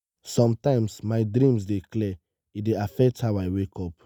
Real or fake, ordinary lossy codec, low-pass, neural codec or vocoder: real; none; 19.8 kHz; none